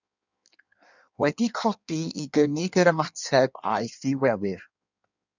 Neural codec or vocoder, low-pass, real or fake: codec, 16 kHz in and 24 kHz out, 1.1 kbps, FireRedTTS-2 codec; 7.2 kHz; fake